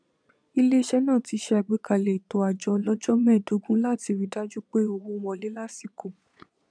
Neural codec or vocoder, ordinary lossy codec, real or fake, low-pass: vocoder, 22.05 kHz, 80 mel bands, Vocos; none; fake; 9.9 kHz